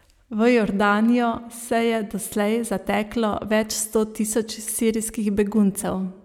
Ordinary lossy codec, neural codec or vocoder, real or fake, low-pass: none; none; real; 19.8 kHz